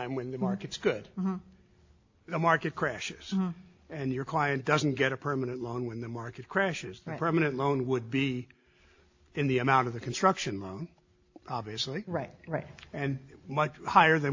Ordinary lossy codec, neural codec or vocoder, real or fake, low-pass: AAC, 48 kbps; none; real; 7.2 kHz